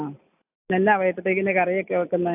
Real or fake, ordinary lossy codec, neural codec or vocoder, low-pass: real; AAC, 32 kbps; none; 3.6 kHz